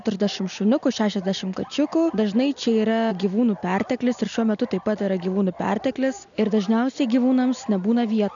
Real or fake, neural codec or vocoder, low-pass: real; none; 7.2 kHz